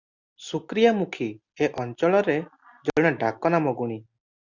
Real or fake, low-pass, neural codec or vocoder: real; 7.2 kHz; none